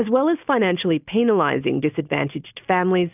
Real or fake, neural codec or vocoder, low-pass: real; none; 3.6 kHz